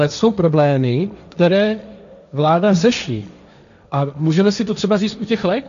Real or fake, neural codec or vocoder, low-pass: fake; codec, 16 kHz, 1.1 kbps, Voila-Tokenizer; 7.2 kHz